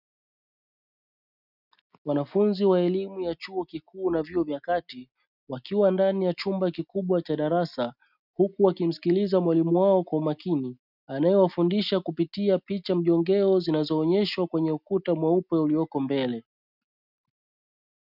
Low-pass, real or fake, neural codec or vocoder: 5.4 kHz; real; none